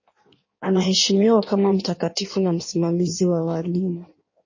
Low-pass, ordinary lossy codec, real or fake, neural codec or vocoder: 7.2 kHz; MP3, 32 kbps; fake; codec, 16 kHz in and 24 kHz out, 1.1 kbps, FireRedTTS-2 codec